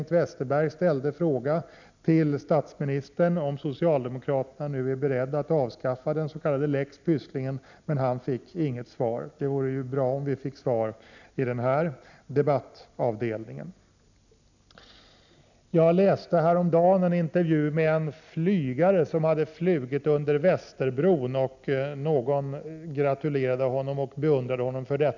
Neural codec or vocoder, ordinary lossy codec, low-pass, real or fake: none; none; 7.2 kHz; real